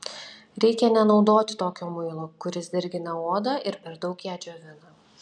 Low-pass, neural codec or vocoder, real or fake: 9.9 kHz; none; real